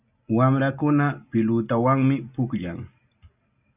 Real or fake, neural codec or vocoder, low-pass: real; none; 3.6 kHz